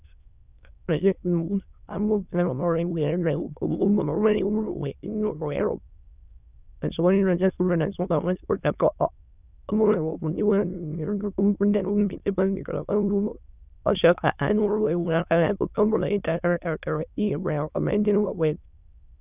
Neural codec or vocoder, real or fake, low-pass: autoencoder, 22.05 kHz, a latent of 192 numbers a frame, VITS, trained on many speakers; fake; 3.6 kHz